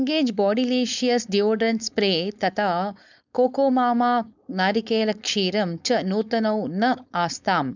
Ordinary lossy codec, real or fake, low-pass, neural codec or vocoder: none; fake; 7.2 kHz; codec, 16 kHz, 4.8 kbps, FACodec